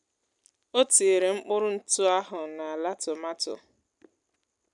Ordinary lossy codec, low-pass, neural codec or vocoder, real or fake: none; 10.8 kHz; none; real